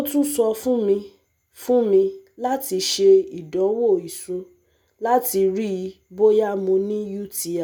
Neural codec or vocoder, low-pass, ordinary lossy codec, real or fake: none; none; none; real